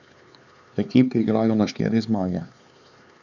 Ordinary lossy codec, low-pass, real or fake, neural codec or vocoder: none; 7.2 kHz; fake; codec, 16 kHz, 4 kbps, X-Codec, HuBERT features, trained on LibriSpeech